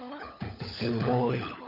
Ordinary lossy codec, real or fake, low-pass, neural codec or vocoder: none; fake; 5.4 kHz; codec, 16 kHz, 16 kbps, FunCodec, trained on LibriTTS, 50 frames a second